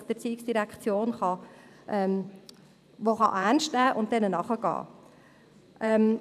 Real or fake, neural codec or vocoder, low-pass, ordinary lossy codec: fake; vocoder, 44.1 kHz, 128 mel bands every 512 samples, BigVGAN v2; 14.4 kHz; none